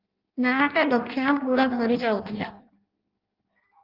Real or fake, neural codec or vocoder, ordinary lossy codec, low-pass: fake; codec, 16 kHz in and 24 kHz out, 0.6 kbps, FireRedTTS-2 codec; Opus, 32 kbps; 5.4 kHz